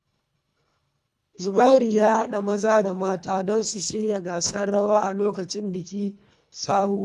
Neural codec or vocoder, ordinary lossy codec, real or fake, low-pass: codec, 24 kHz, 1.5 kbps, HILCodec; none; fake; none